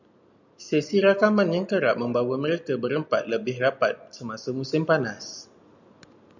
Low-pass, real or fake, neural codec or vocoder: 7.2 kHz; real; none